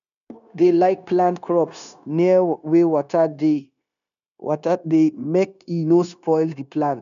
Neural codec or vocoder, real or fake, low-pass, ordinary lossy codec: codec, 16 kHz, 0.9 kbps, LongCat-Audio-Codec; fake; 7.2 kHz; none